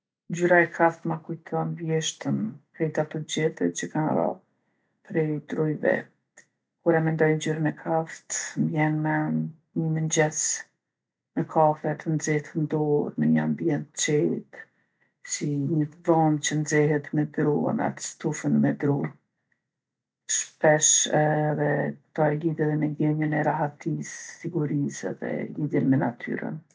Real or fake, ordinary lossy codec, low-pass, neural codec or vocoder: real; none; none; none